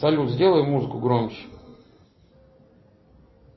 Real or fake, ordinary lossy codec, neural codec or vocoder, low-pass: real; MP3, 24 kbps; none; 7.2 kHz